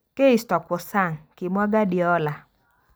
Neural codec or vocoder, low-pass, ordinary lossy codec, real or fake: none; none; none; real